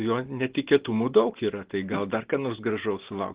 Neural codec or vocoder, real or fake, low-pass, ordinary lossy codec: none; real; 3.6 kHz; Opus, 16 kbps